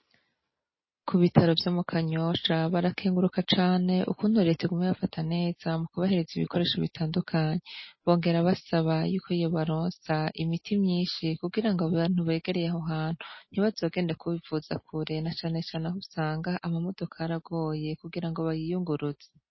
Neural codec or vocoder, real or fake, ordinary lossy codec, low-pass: none; real; MP3, 24 kbps; 7.2 kHz